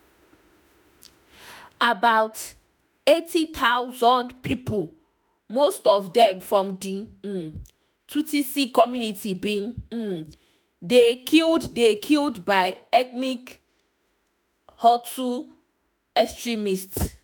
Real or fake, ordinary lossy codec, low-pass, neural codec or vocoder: fake; none; none; autoencoder, 48 kHz, 32 numbers a frame, DAC-VAE, trained on Japanese speech